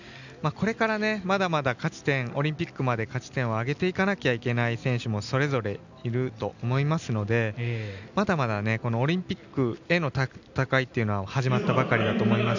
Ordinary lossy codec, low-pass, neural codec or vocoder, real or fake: none; 7.2 kHz; none; real